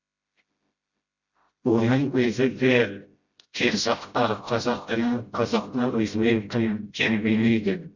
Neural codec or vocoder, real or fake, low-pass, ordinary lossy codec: codec, 16 kHz, 0.5 kbps, FreqCodec, smaller model; fake; 7.2 kHz; Opus, 64 kbps